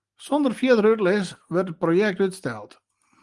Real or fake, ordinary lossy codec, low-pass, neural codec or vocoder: real; Opus, 24 kbps; 10.8 kHz; none